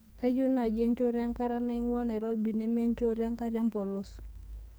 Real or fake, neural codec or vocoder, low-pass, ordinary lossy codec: fake; codec, 44.1 kHz, 2.6 kbps, SNAC; none; none